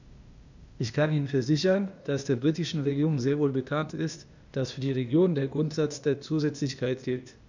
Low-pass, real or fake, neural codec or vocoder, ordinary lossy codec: 7.2 kHz; fake; codec, 16 kHz, 0.8 kbps, ZipCodec; none